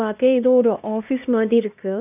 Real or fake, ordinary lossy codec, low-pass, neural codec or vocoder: fake; none; 3.6 kHz; codec, 16 kHz, 1 kbps, X-Codec, HuBERT features, trained on LibriSpeech